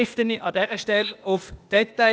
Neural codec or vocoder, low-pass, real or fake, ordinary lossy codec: codec, 16 kHz, 0.8 kbps, ZipCodec; none; fake; none